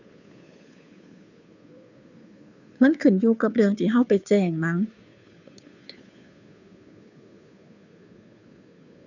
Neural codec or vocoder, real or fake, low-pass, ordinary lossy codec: codec, 16 kHz, 2 kbps, FunCodec, trained on Chinese and English, 25 frames a second; fake; 7.2 kHz; none